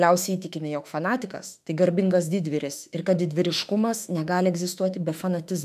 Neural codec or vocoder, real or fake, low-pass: autoencoder, 48 kHz, 32 numbers a frame, DAC-VAE, trained on Japanese speech; fake; 14.4 kHz